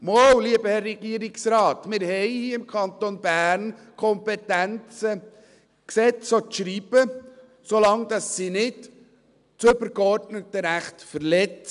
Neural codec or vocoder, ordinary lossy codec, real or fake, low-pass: none; none; real; 10.8 kHz